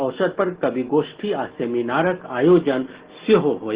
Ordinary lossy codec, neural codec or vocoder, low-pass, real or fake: Opus, 16 kbps; none; 3.6 kHz; real